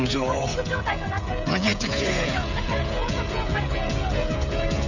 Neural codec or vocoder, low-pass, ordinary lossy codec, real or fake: codec, 16 kHz, 16 kbps, FreqCodec, smaller model; 7.2 kHz; none; fake